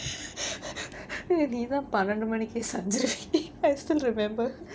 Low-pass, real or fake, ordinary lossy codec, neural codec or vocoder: none; real; none; none